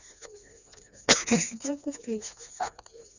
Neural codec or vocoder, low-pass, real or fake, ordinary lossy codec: codec, 16 kHz, 2 kbps, FreqCodec, smaller model; 7.2 kHz; fake; none